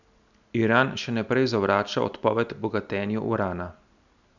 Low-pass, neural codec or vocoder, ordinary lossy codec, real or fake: 7.2 kHz; none; none; real